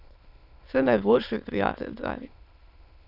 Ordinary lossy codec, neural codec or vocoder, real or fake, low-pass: none; autoencoder, 22.05 kHz, a latent of 192 numbers a frame, VITS, trained on many speakers; fake; 5.4 kHz